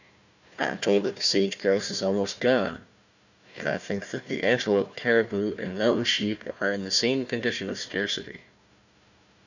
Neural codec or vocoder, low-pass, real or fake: codec, 16 kHz, 1 kbps, FunCodec, trained on Chinese and English, 50 frames a second; 7.2 kHz; fake